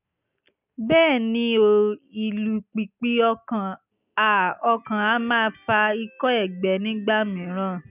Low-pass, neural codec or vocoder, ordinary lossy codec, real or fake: 3.6 kHz; none; none; real